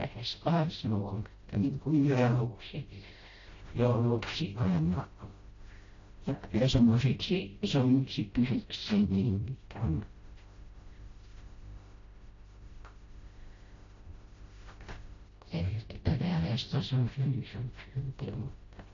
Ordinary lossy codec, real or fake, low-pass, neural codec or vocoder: AAC, 32 kbps; fake; 7.2 kHz; codec, 16 kHz, 0.5 kbps, FreqCodec, smaller model